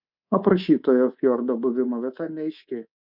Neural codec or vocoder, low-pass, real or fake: codec, 24 kHz, 3.1 kbps, DualCodec; 5.4 kHz; fake